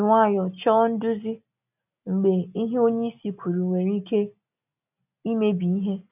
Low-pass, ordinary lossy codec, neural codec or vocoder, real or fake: 3.6 kHz; none; none; real